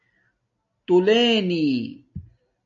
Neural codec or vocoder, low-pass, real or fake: none; 7.2 kHz; real